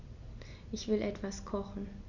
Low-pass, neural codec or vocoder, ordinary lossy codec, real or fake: 7.2 kHz; none; none; real